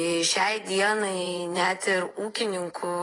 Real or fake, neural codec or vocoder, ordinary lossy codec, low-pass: fake; vocoder, 24 kHz, 100 mel bands, Vocos; AAC, 32 kbps; 10.8 kHz